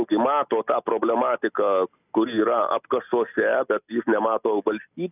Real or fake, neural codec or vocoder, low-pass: real; none; 3.6 kHz